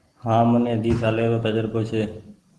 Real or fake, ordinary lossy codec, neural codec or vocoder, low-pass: real; Opus, 16 kbps; none; 10.8 kHz